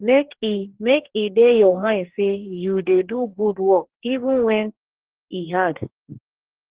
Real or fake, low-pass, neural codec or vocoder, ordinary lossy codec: fake; 3.6 kHz; codec, 44.1 kHz, 2.6 kbps, DAC; Opus, 16 kbps